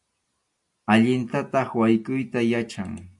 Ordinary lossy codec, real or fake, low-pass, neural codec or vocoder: AAC, 64 kbps; real; 10.8 kHz; none